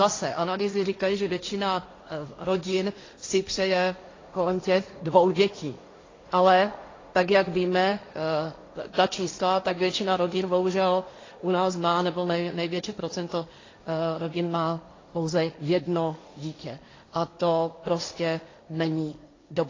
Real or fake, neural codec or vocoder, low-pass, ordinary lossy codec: fake; codec, 16 kHz, 1.1 kbps, Voila-Tokenizer; 7.2 kHz; AAC, 32 kbps